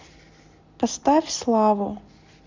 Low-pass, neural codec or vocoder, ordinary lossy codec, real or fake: 7.2 kHz; none; MP3, 64 kbps; real